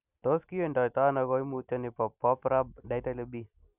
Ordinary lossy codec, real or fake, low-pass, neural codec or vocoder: none; real; 3.6 kHz; none